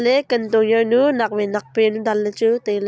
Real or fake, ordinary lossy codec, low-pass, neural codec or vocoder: real; none; none; none